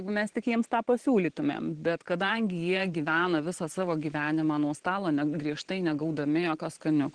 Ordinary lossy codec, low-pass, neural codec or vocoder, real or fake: Opus, 16 kbps; 9.9 kHz; vocoder, 44.1 kHz, 128 mel bands every 512 samples, BigVGAN v2; fake